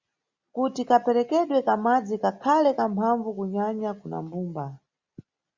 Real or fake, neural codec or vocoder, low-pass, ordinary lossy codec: real; none; 7.2 kHz; Opus, 64 kbps